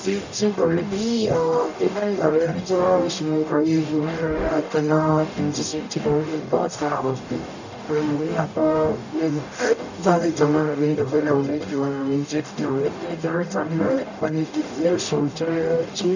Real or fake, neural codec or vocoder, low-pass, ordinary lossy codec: fake; codec, 44.1 kHz, 0.9 kbps, DAC; 7.2 kHz; none